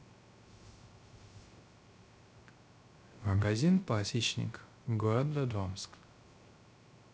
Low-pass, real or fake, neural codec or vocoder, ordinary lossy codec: none; fake; codec, 16 kHz, 0.3 kbps, FocalCodec; none